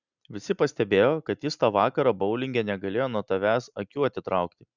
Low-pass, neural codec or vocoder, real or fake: 7.2 kHz; none; real